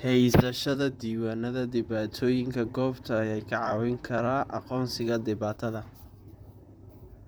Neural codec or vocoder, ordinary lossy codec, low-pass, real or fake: vocoder, 44.1 kHz, 128 mel bands, Pupu-Vocoder; none; none; fake